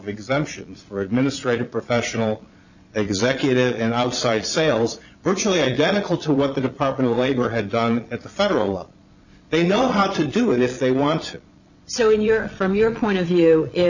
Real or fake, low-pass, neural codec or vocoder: fake; 7.2 kHz; vocoder, 22.05 kHz, 80 mel bands, Vocos